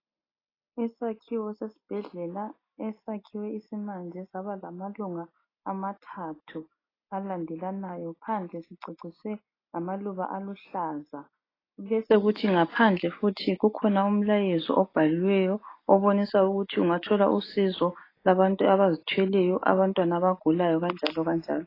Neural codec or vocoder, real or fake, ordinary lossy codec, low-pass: none; real; AAC, 24 kbps; 5.4 kHz